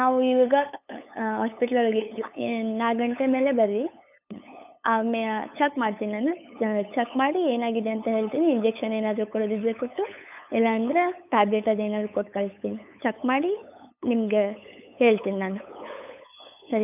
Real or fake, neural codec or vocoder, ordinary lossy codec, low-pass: fake; codec, 16 kHz, 8 kbps, FunCodec, trained on LibriTTS, 25 frames a second; none; 3.6 kHz